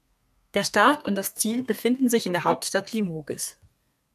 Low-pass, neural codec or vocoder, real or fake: 14.4 kHz; codec, 32 kHz, 1.9 kbps, SNAC; fake